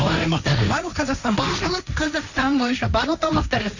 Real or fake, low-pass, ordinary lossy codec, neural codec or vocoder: fake; 7.2 kHz; none; codec, 16 kHz, 1.1 kbps, Voila-Tokenizer